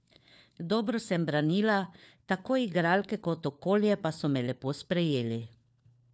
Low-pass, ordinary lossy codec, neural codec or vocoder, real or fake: none; none; codec, 16 kHz, 4 kbps, FunCodec, trained on LibriTTS, 50 frames a second; fake